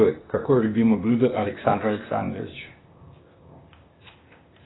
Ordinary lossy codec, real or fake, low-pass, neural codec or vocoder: AAC, 16 kbps; fake; 7.2 kHz; codec, 16 kHz, 0.8 kbps, ZipCodec